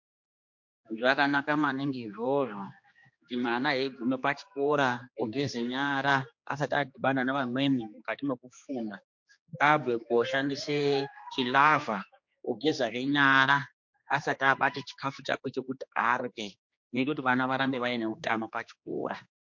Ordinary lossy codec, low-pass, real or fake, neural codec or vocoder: MP3, 48 kbps; 7.2 kHz; fake; codec, 16 kHz, 2 kbps, X-Codec, HuBERT features, trained on general audio